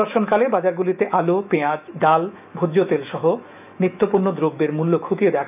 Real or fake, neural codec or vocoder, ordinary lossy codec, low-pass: fake; autoencoder, 48 kHz, 128 numbers a frame, DAC-VAE, trained on Japanese speech; none; 3.6 kHz